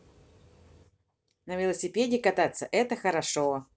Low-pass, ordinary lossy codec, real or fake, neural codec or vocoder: none; none; real; none